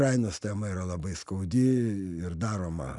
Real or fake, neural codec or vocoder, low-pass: real; none; 10.8 kHz